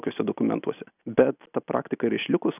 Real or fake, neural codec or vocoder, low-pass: real; none; 3.6 kHz